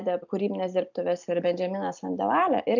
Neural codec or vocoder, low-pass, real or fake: none; 7.2 kHz; real